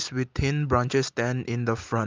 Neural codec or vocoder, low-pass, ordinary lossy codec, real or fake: none; 7.2 kHz; Opus, 24 kbps; real